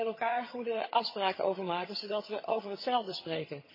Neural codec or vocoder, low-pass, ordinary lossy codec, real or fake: vocoder, 22.05 kHz, 80 mel bands, HiFi-GAN; 5.4 kHz; MP3, 24 kbps; fake